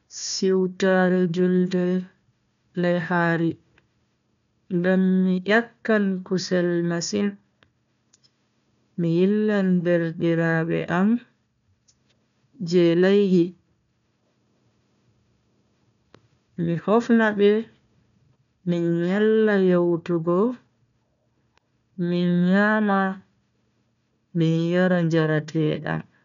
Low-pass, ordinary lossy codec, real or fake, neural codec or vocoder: 7.2 kHz; none; fake; codec, 16 kHz, 1 kbps, FunCodec, trained on Chinese and English, 50 frames a second